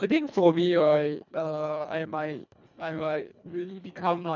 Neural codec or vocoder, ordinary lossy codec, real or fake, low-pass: codec, 24 kHz, 1.5 kbps, HILCodec; none; fake; 7.2 kHz